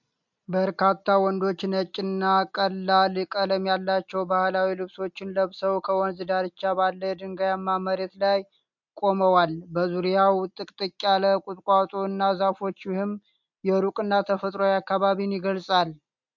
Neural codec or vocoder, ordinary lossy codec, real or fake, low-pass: none; MP3, 64 kbps; real; 7.2 kHz